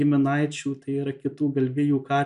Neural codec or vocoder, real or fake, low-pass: none; real; 10.8 kHz